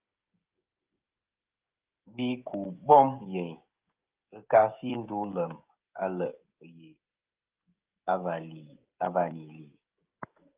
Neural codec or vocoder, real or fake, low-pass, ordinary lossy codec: codec, 16 kHz, 16 kbps, FreqCodec, smaller model; fake; 3.6 kHz; Opus, 24 kbps